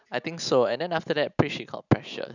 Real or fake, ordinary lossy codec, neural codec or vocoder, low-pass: real; none; none; 7.2 kHz